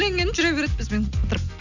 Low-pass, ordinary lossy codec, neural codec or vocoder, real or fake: 7.2 kHz; none; none; real